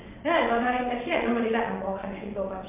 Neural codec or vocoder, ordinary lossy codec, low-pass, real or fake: vocoder, 22.05 kHz, 80 mel bands, WaveNeXt; MP3, 32 kbps; 3.6 kHz; fake